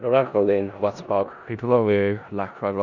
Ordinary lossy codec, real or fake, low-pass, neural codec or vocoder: none; fake; 7.2 kHz; codec, 16 kHz in and 24 kHz out, 0.4 kbps, LongCat-Audio-Codec, four codebook decoder